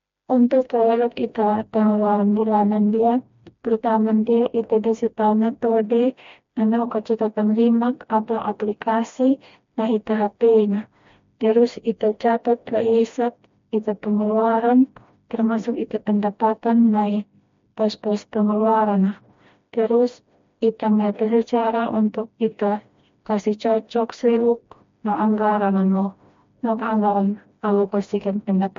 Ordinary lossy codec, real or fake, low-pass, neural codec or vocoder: MP3, 48 kbps; fake; 7.2 kHz; codec, 16 kHz, 1 kbps, FreqCodec, smaller model